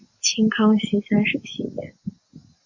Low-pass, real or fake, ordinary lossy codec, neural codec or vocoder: 7.2 kHz; real; AAC, 48 kbps; none